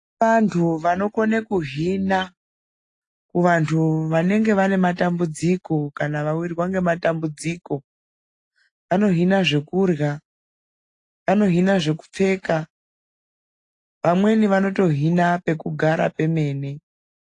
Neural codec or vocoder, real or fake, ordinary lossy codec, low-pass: none; real; AAC, 48 kbps; 10.8 kHz